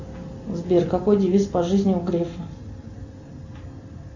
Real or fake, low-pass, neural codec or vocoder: real; 7.2 kHz; none